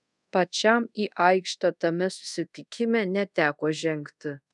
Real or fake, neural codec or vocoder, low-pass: fake; codec, 24 kHz, 0.5 kbps, DualCodec; 10.8 kHz